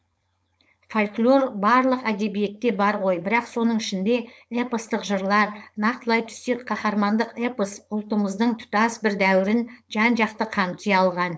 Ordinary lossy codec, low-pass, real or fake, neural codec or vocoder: none; none; fake; codec, 16 kHz, 4.8 kbps, FACodec